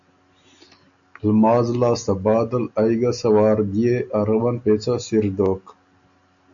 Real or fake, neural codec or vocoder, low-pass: real; none; 7.2 kHz